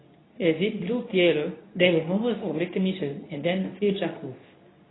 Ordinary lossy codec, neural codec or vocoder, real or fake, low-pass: AAC, 16 kbps; codec, 24 kHz, 0.9 kbps, WavTokenizer, medium speech release version 2; fake; 7.2 kHz